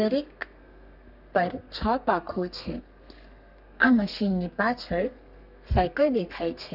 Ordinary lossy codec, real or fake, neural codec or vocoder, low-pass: none; fake; codec, 32 kHz, 1.9 kbps, SNAC; 5.4 kHz